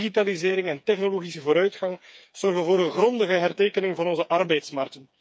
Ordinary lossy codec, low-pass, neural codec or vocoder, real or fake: none; none; codec, 16 kHz, 4 kbps, FreqCodec, smaller model; fake